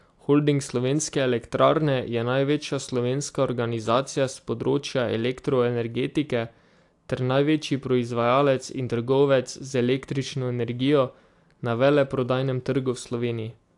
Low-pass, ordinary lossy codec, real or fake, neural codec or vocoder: 10.8 kHz; AAC, 64 kbps; real; none